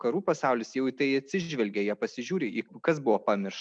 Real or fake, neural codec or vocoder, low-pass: real; none; 9.9 kHz